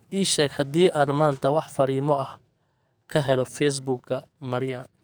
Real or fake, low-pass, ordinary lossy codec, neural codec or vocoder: fake; none; none; codec, 44.1 kHz, 2.6 kbps, SNAC